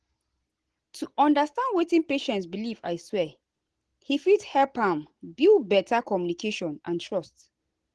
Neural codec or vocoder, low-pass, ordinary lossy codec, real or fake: none; 10.8 kHz; Opus, 16 kbps; real